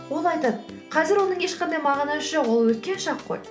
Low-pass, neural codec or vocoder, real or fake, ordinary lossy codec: none; none; real; none